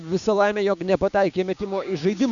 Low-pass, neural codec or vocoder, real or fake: 7.2 kHz; codec, 16 kHz, 6 kbps, DAC; fake